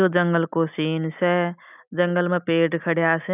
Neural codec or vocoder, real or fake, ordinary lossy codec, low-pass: none; real; none; 3.6 kHz